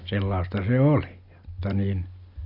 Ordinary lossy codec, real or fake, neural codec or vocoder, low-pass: none; real; none; 5.4 kHz